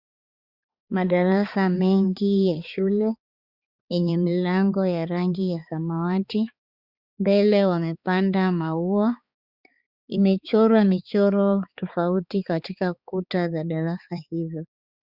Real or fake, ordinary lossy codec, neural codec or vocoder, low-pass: fake; Opus, 64 kbps; codec, 16 kHz, 4 kbps, X-Codec, HuBERT features, trained on balanced general audio; 5.4 kHz